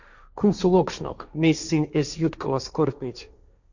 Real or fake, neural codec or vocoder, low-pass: fake; codec, 16 kHz, 1.1 kbps, Voila-Tokenizer; 7.2 kHz